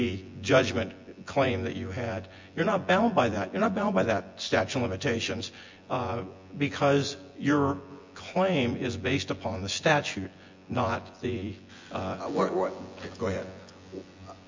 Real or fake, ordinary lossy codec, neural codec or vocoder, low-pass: fake; MP3, 48 kbps; vocoder, 24 kHz, 100 mel bands, Vocos; 7.2 kHz